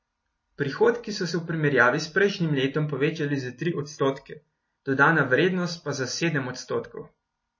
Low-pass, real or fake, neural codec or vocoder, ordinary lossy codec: 7.2 kHz; real; none; MP3, 32 kbps